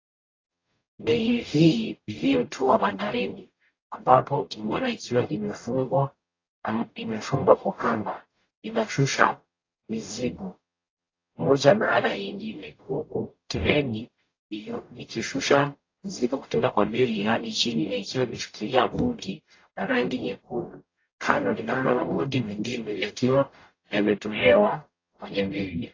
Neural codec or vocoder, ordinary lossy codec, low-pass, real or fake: codec, 44.1 kHz, 0.9 kbps, DAC; AAC, 32 kbps; 7.2 kHz; fake